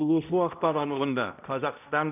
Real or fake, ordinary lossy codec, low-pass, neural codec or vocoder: fake; none; 3.6 kHz; codec, 16 kHz, 0.5 kbps, X-Codec, HuBERT features, trained on balanced general audio